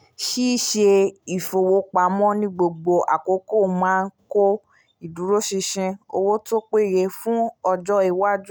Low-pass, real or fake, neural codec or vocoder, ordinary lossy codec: none; real; none; none